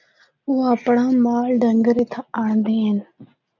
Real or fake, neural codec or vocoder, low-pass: real; none; 7.2 kHz